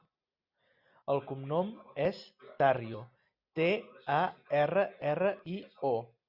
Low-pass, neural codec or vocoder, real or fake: 5.4 kHz; none; real